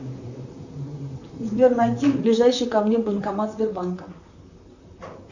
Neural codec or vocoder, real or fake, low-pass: vocoder, 44.1 kHz, 128 mel bands, Pupu-Vocoder; fake; 7.2 kHz